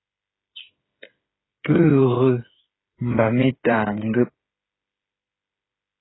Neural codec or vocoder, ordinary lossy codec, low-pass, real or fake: codec, 16 kHz, 8 kbps, FreqCodec, smaller model; AAC, 16 kbps; 7.2 kHz; fake